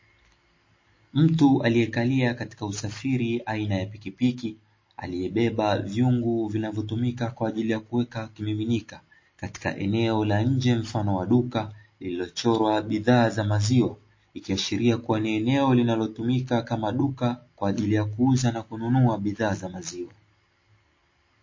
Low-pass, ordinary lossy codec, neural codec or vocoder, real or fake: 7.2 kHz; MP3, 32 kbps; none; real